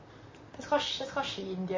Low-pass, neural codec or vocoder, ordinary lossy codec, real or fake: 7.2 kHz; vocoder, 44.1 kHz, 128 mel bands, Pupu-Vocoder; MP3, 32 kbps; fake